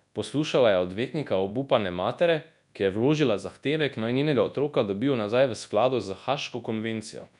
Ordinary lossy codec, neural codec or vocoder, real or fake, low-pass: none; codec, 24 kHz, 0.9 kbps, WavTokenizer, large speech release; fake; 10.8 kHz